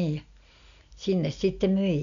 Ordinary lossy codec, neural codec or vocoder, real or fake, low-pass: none; none; real; 7.2 kHz